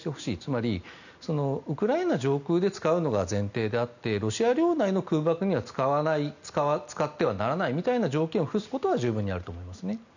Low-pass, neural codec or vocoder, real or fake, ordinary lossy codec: 7.2 kHz; none; real; none